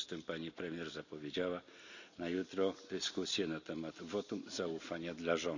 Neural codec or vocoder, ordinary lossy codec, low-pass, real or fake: none; none; 7.2 kHz; real